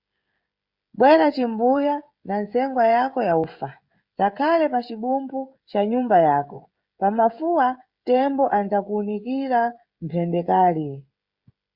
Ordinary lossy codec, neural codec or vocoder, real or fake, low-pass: Opus, 64 kbps; codec, 16 kHz, 16 kbps, FreqCodec, smaller model; fake; 5.4 kHz